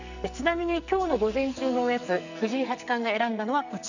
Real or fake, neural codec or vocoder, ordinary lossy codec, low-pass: fake; codec, 44.1 kHz, 2.6 kbps, SNAC; none; 7.2 kHz